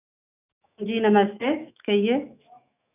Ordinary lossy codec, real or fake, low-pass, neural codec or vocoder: none; fake; 3.6 kHz; autoencoder, 48 kHz, 128 numbers a frame, DAC-VAE, trained on Japanese speech